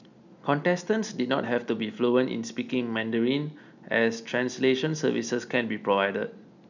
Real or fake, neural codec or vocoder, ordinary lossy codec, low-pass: real; none; none; 7.2 kHz